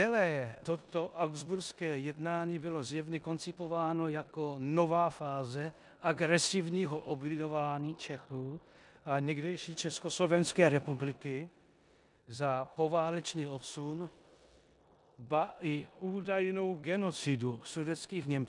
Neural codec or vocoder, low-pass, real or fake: codec, 16 kHz in and 24 kHz out, 0.9 kbps, LongCat-Audio-Codec, four codebook decoder; 10.8 kHz; fake